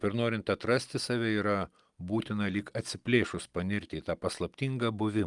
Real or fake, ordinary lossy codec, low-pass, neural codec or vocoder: real; Opus, 32 kbps; 10.8 kHz; none